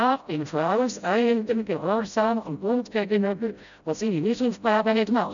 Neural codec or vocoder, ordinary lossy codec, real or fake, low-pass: codec, 16 kHz, 0.5 kbps, FreqCodec, smaller model; none; fake; 7.2 kHz